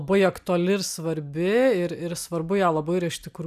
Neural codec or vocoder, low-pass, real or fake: none; 14.4 kHz; real